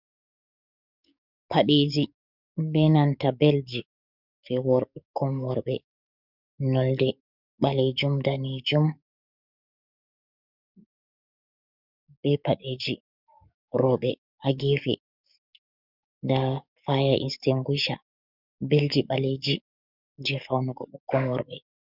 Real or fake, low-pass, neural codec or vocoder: fake; 5.4 kHz; codec, 44.1 kHz, 7.8 kbps, DAC